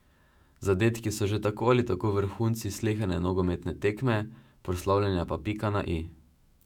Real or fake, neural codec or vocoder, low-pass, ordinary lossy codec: fake; autoencoder, 48 kHz, 128 numbers a frame, DAC-VAE, trained on Japanese speech; 19.8 kHz; none